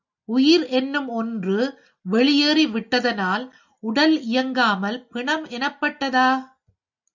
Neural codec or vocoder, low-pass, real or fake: none; 7.2 kHz; real